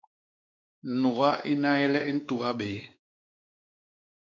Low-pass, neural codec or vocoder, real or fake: 7.2 kHz; codec, 16 kHz, 2 kbps, X-Codec, WavLM features, trained on Multilingual LibriSpeech; fake